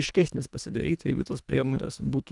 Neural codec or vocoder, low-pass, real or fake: codec, 24 kHz, 1.5 kbps, HILCodec; 10.8 kHz; fake